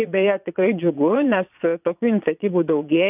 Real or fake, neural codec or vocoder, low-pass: fake; vocoder, 22.05 kHz, 80 mel bands, Vocos; 3.6 kHz